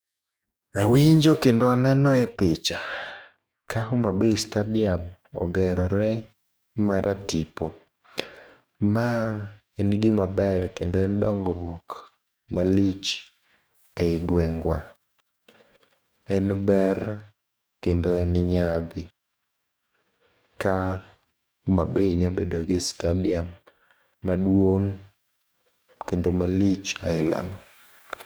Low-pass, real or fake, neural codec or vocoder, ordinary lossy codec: none; fake; codec, 44.1 kHz, 2.6 kbps, DAC; none